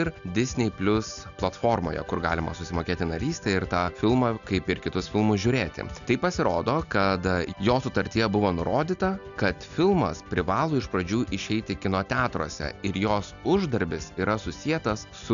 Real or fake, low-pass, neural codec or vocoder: real; 7.2 kHz; none